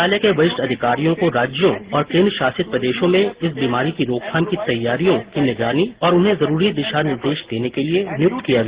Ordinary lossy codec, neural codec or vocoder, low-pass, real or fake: Opus, 16 kbps; none; 3.6 kHz; real